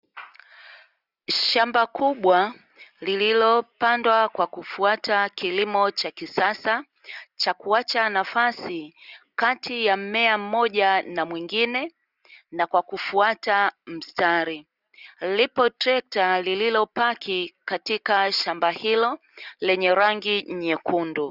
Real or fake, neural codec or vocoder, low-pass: real; none; 5.4 kHz